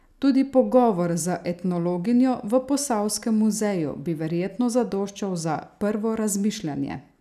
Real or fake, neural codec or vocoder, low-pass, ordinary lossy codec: real; none; 14.4 kHz; none